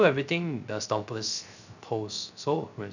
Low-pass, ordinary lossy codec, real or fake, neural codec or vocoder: 7.2 kHz; none; fake; codec, 16 kHz, 0.3 kbps, FocalCodec